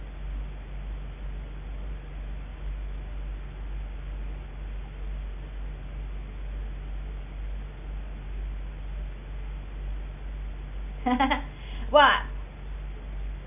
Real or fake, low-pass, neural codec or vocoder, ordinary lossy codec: real; 3.6 kHz; none; none